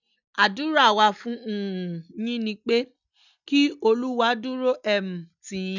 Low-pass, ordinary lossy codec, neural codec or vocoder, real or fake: 7.2 kHz; none; none; real